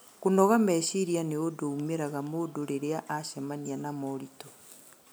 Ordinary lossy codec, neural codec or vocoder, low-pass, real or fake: none; none; none; real